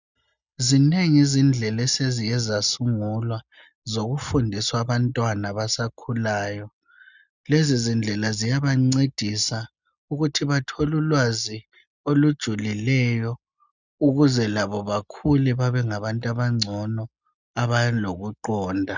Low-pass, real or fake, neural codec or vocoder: 7.2 kHz; real; none